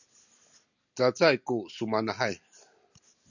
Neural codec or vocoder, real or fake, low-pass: none; real; 7.2 kHz